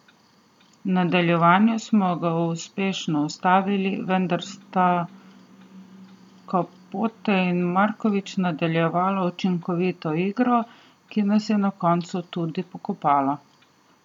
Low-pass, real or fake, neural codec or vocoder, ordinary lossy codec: 19.8 kHz; real; none; none